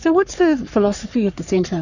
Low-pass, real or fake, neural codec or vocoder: 7.2 kHz; fake; codec, 44.1 kHz, 3.4 kbps, Pupu-Codec